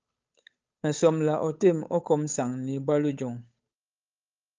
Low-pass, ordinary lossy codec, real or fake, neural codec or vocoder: 7.2 kHz; Opus, 24 kbps; fake; codec, 16 kHz, 8 kbps, FunCodec, trained on Chinese and English, 25 frames a second